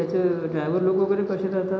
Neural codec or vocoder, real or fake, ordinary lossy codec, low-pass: none; real; none; none